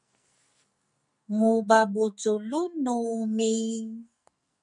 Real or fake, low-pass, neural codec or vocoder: fake; 10.8 kHz; codec, 44.1 kHz, 2.6 kbps, SNAC